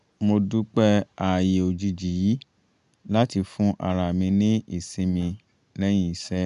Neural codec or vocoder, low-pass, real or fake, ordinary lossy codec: none; 10.8 kHz; real; none